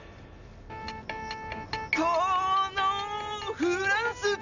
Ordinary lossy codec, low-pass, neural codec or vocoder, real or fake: none; 7.2 kHz; none; real